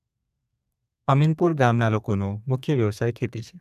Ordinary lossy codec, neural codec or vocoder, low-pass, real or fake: none; codec, 32 kHz, 1.9 kbps, SNAC; 14.4 kHz; fake